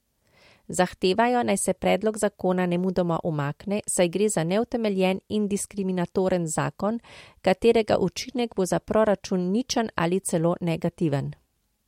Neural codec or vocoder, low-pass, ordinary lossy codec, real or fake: none; 19.8 kHz; MP3, 64 kbps; real